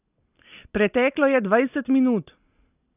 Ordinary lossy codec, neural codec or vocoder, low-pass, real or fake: none; none; 3.6 kHz; real